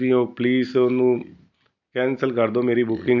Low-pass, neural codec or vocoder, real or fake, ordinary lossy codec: 7.2 kHz; none; real; none